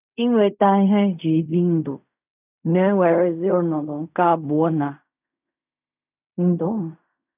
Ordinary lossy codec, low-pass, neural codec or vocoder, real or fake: none; 3.6 kHz; codec, 16 kHz in and 24 kHz out, 0.4 kbps, LongCat-Audio-Codec, fine tuned four codebook decoder; fake